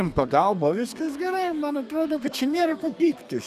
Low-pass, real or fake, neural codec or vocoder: 14.4 kHz; fake; codec, 32 kHz, 1.9 kbps, SNAC